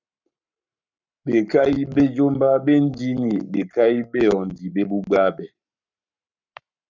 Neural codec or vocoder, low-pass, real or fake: codec, 44.1 kHz, 7.8 kbps, Pupu-Codec; 7.2 kHz; fake